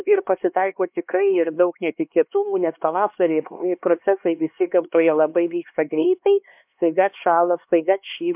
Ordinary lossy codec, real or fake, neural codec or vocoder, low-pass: MP3, 32 kbps; fake; codec, 16 kHz, 2 kbps, X-Codec, HuBERT features, trained on LibriSpeech; 3.6 kHz